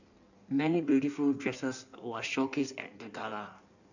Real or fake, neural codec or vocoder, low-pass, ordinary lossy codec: fake; codec, 16 kHz in and 24 kHz out, 1.1 kbps, FireRedTTS-2 codec; 7.2 kHz; none